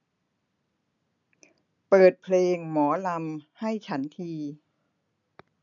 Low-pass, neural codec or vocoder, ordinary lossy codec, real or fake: 7.2 kHz; none; none; real